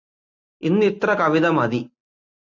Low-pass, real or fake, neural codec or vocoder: 7.2 kHz; real; none